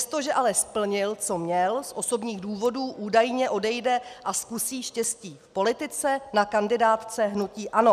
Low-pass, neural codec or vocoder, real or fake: 14.4 kHz; none; real